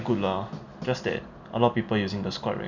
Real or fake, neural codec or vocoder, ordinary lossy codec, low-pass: real; none; none; 7.2 kHz